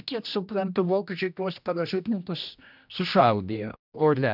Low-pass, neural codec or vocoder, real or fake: 5.4 kHz; codec, 16 kHz, 1 kbps, X-Codec, HuBERT features, trained on general audio; fake